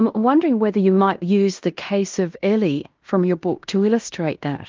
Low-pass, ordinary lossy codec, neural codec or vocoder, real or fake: 7.2 kHz; Opus, 32 kbps; codec, 16 kHz in and 24 kHz out, 0.9 kbps, LongCat-Audio-Codec, fine tuned four codebook decoder; fake